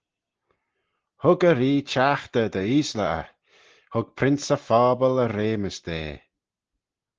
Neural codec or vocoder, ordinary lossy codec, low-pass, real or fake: none; Opus, 16 kbps; 7.2 kHz; real